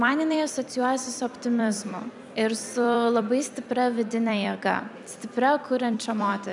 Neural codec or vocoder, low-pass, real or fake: vocoder, 44.1 kHz, 128 mel bands every 256 samples, BigVGAN v2; 10.8 kHz; fake